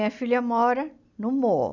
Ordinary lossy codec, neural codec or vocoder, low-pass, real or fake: none; none; 7.2 kHz; real